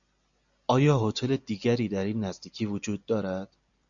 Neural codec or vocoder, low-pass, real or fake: none; 7.2 kHz; real